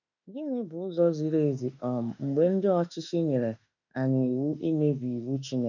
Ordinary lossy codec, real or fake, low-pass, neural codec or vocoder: none; fake; 7.2 kHz; autoencoder, 48 kHz, 32 numbers a frame, DAC-VAE, trained on Japanese speech